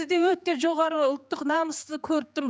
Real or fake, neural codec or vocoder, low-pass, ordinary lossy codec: fake; codec, 16 kHz, 4 kbps, X-Codec, HuBERT features, trained on general audio; none; none